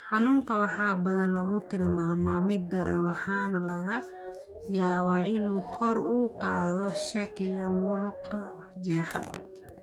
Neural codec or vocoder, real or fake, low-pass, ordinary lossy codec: codec, 44.1 kHz, 2.6 kbps, DAC; fake; 19.8 kHz; none